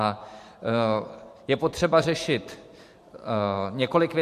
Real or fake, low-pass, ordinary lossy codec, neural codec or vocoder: fake; 14.4 kHz; MP3, 64 kbps; vocoder, 44.1 kHz, 128 mel bands every 512 samples, BigVGAN v2